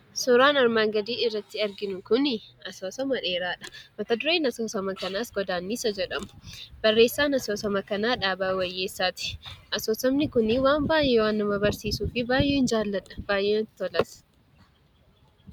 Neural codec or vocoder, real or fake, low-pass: none; real; 19.8 kHz